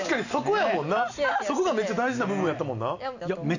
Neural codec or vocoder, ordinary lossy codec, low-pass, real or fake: none; none; 7.2 kHz; real